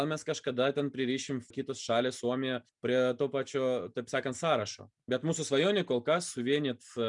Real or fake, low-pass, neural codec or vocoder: real; 10.8 kHz; none